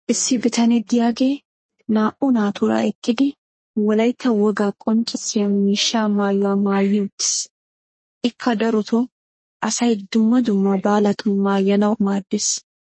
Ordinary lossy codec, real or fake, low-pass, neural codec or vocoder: MP3, 32 kbps; fake; 9.9 kHz; codec, 32 kHz, 1.9 kbps, SNAC